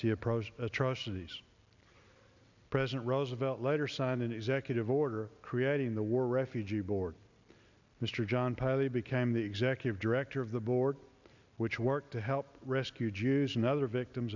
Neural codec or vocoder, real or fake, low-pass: none; real; 7.2 kHz